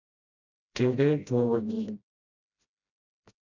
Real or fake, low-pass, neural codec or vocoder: fake; 7.2 kHz; codec, 16 kHz, 0.5 kbps, FreqCodec, smaller model